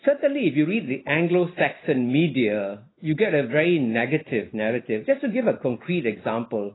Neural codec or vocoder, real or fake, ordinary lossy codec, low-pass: none; real; AAC, 16 kbps; 7.2 kHz